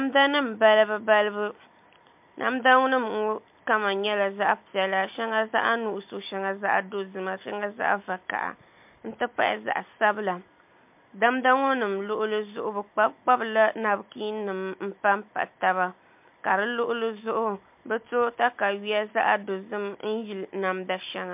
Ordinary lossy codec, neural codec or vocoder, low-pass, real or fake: MP3, 32 kbps; none; 3.6 kHz; real